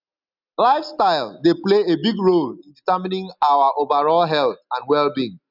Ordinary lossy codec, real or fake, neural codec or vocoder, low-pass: none; real; none; 5.4 kHz